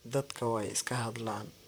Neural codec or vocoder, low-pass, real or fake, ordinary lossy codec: vocoder, 44.1 kHz, 128 mel bands, Pupu-Vocoder; none; fake; none